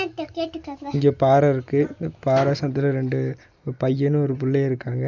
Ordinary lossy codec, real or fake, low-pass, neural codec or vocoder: none; real; 7.2 kHz; none